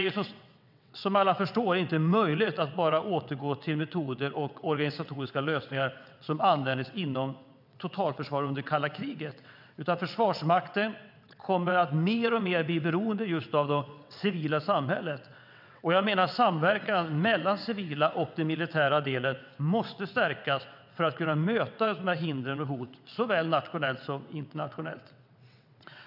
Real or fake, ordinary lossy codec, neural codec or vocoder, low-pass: fake; none; vocoder, 22.05 kHz, 80 mel bands, WaveNeXt; 5.4 kHz